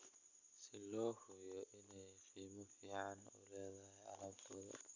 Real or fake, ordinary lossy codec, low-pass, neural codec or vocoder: real; none; 7.2 kHz; none